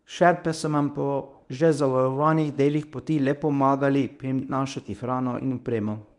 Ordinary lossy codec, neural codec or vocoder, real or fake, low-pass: none; codec, 24 kHz, 0.9 kbps, WavTokenizer, medium speech release version 1; fake; 10.8 kHz